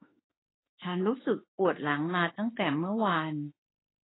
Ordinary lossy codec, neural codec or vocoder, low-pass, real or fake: AAC, 16 kbps; autoencoder, 48 kHz, 32 numbers a frame, DAC-VAE, trained on Japanese speech; 7.2 kHz; fake